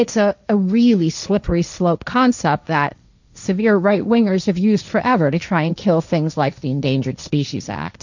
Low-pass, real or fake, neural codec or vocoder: 7.2 kHz; fake; codec, 16 kHz, 1.1 kbps, Voila-Tokenizer